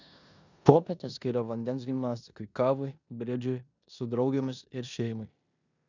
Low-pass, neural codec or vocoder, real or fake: 7.2 kHz; codec, 16 kHz in and 24 kHz out, 0.9 kbps, LongCat-Audio-Codec, four codebook decoder; fake